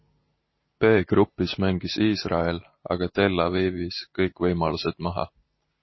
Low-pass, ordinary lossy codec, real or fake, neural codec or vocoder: 7.2 kHz; MP3, 24 kbps; real; none